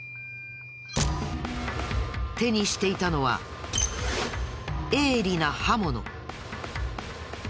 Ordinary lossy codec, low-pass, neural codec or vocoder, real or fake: none; none; none; real